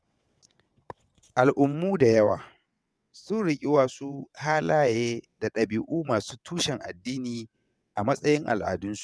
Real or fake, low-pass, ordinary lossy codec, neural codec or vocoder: fake; none; none; vocoder, 22.05 kHz, 80 mel bands, WaveNeXt